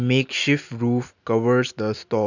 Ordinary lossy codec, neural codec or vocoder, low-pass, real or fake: none; none; 7.2 kHz; real